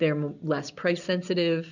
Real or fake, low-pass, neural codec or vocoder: real; 7.2 kHz; none